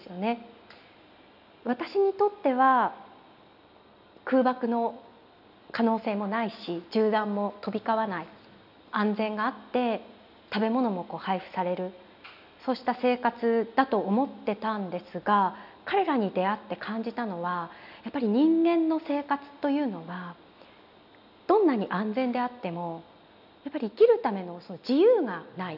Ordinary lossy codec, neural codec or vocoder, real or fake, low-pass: none; none; real; 5.4 kHz